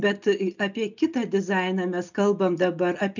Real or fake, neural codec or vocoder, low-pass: real; none; 7.2 kHz